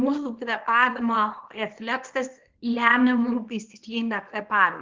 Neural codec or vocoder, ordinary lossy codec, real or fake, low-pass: codec, 24 kHz, 0.9 kbps, WavTokenizer, small release; Opus, 16 kbps; fake; 7.2 kHz